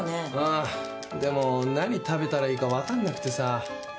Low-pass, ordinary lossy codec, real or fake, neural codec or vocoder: none; none; real; none